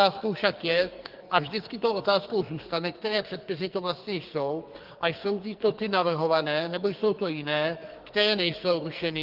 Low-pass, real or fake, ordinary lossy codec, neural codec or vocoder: 5.4 kHz; fake; Opus, 32 kbps; codec, 44.1 kHz, 2.6 kbps, SNAC